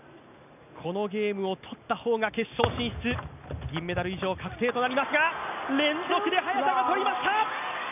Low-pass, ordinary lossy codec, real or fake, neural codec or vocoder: 3.6 kHz; none; real; none